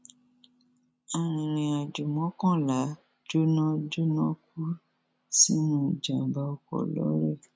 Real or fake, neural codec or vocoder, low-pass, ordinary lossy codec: real; none; none; none